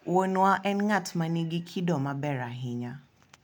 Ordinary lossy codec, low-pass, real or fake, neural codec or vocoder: none; 19.8 kHz; real; none